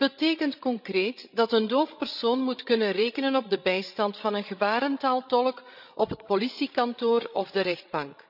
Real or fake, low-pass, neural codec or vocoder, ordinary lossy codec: fake; 5.4 kHz; codec, 16 kHz, 16 kbps, FreqCodec, larger model; none